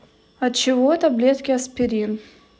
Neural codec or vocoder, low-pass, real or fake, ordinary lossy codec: none; none; real; none